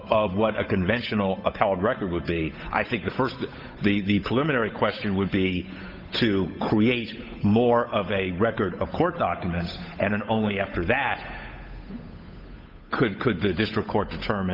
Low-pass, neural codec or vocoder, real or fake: 5.4 kHz; codec, 16 kHz, 8 kbps, FunCodec, trained on Chinese and English, 25 frames a second; fake